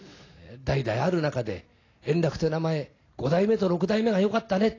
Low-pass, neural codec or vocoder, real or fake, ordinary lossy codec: 7.2 kHz; none; real; AAC, 32 kbps